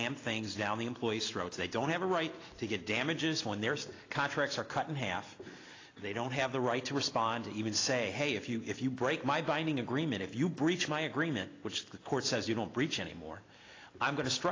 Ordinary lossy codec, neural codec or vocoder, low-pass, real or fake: AAC, 32 kbps; none; 7.2 kHz; real